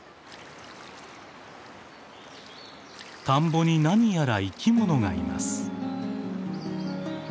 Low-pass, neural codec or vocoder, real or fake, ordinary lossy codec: none; none; real; none